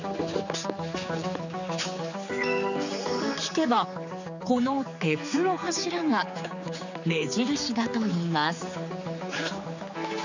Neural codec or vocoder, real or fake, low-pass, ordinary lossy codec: codec, 16 kHz, 4 kbps, X-Codec, HuBERT features, trained on general audio; fake; 7.2 kHz; none